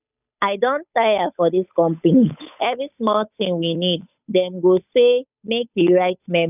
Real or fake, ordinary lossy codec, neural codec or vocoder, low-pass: fake; none; codec, 16 kHz, 8 kbps, FunCodec, trained on Chinese and English, 25 frames a second; 3.6 kHz